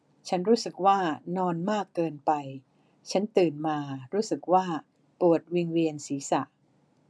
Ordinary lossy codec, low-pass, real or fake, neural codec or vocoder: none; none; real; none